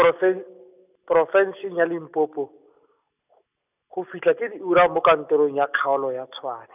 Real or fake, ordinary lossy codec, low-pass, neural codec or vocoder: real; none; 3.6 kHz; none